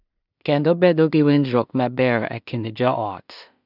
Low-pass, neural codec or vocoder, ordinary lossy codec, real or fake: 5.4 kHz; codec, 16 kHz in and 24 kHz out, 0.4 kbps, LongCat-Audio-Codec, two codebook decoder; none; fake